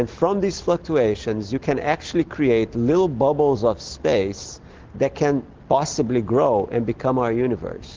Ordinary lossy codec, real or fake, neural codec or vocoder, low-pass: Opus, 16 kbps; real; none; 7.2 kHz